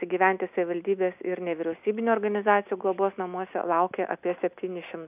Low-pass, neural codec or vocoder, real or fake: 3.6 kHz; none; real